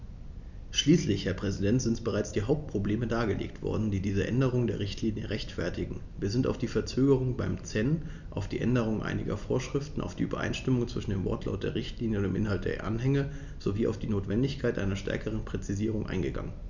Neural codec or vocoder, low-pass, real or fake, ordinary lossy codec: none; 7.2 kHz; real; none